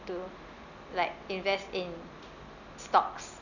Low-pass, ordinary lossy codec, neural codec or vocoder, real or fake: 7.2 kHz; none; none; real